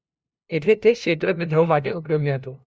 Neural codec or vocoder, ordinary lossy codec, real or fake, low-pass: codec, 16 kHz, 0.5 kbps, FunCodec, trained on LibriTTS, 25 frames a second; none; fake; none